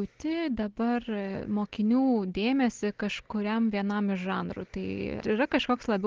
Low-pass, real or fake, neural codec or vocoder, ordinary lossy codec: 7.2 kHz; real; none; Opus, 16 kbps